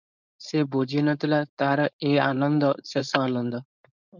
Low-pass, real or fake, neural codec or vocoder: 7.2 kHz; fake; codec, 16 kHz, 4.8 kbps, FACodec